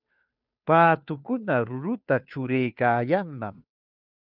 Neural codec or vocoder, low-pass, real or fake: codec, 16 kHz, 2 kbps, FunCodec, trained on Chinese and English, 25 frames a second; 5.4 kHz; fake